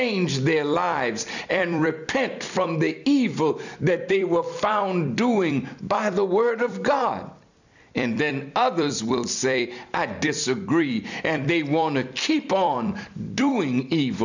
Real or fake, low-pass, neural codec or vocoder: fake; 7.2 kHz; vocoder, 44.1 kHz, 128 mel bands every 256 samples, BigVGAN v2